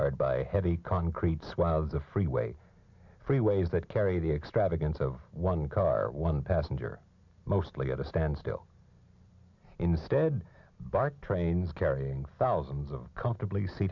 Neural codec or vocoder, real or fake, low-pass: none; real; 7.2 kHz